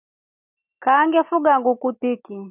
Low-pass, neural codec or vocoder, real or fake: 3.6 kHz; none; real